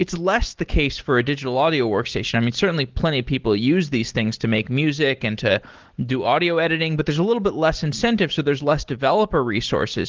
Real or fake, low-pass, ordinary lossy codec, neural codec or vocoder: real; 7.2 kHz; Opus, 16 kbps; none